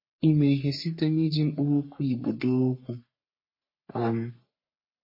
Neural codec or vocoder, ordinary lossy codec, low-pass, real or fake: codec, 44.1 kHz, 3.4 kbps, Pupu-Codec; MP3, 24 kbps; 5.4 kHz; fake